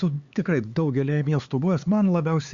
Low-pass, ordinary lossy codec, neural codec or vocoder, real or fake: 7.2 kHz; Opus, 64 kbps; codec, 16 kHz, 4 kbps, X-Codec, HuBERT features, trained on LibriSpeech; fake